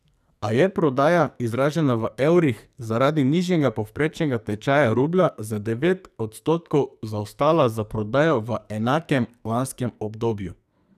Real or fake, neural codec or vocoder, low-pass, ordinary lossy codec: fake; codec, 44.1 kHz, 2.6 kbps, SNAC; 14.4 kHz; none